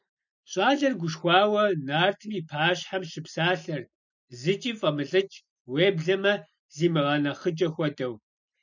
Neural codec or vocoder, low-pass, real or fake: none; 7.2 kHz; real